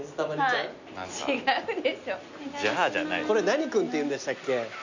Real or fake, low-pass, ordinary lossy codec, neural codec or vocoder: real; 7.2 kHz; Opus, 64 kbps; none